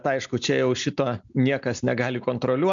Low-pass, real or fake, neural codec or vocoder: 7.2 kHz; real; none